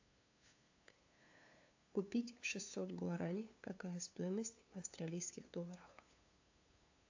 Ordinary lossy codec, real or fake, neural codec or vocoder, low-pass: AAC, 48 kbps; fake; codec, 16 kHz, 2 kbps, FunCodec, trained on LibriTTS, 25 frames a second; 7.2 kHz